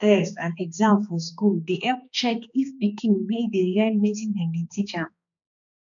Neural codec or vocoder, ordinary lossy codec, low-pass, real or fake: codec, 16 kHz, 2 kbps, X-Codec, HuBERT features, trained on balanced general audio; none; 7.2 kHz; fake